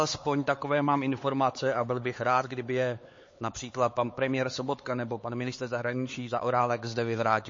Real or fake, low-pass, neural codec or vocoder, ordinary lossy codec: fake; 7.2 kHz; codec, 16 kHz, 4 kbps, X-Codec, HuBERT features, trained on LibriSpeech; MP3, 32 kbps